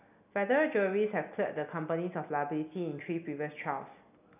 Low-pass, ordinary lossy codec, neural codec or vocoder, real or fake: 3.6 kHz; none; none; real